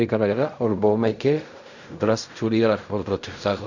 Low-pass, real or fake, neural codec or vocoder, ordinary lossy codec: 7.2 kHz; fake; codec, 16 kHz in and 24 kHz out, 0.4 kbps, LongCat-Audio-Codec, fine tuned four codebook decoder; none